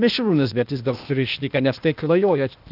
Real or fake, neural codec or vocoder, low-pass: fake; codec, 16 kHz, 0.8 kbps, ZipCodec; 5.4 kHz